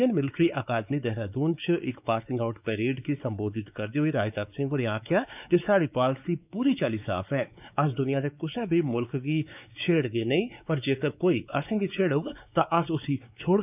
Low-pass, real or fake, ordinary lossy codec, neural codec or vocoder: 3.6 kHz; fake; none; codec, 16 kHz, 4 kbps, X-Codec, WavLM features, trained on Multilingual LibriSpeech